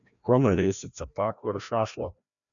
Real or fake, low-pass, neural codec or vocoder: fake; 7.2 kHz; codec, 16 kHz, 1 kbps, FreqCodec, larger model